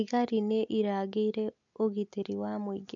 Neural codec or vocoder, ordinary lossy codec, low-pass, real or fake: none; MP3, 64 kbps; 7.2 kHz; real